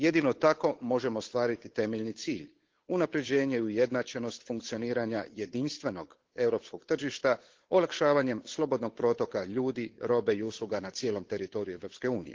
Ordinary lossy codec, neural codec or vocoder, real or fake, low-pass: Opus, 16 kbps; codec, 16 kHz, 8 kbps, FunCodec, trained on Chinese and English, 25 frames a second; fake; 7.2 kHz